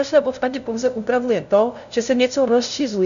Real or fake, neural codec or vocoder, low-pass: fake; codec, 16 kHz, 0.5 kbps, FunCodec, trained on LibriTTS, 25 frames a second; 7.2 kHz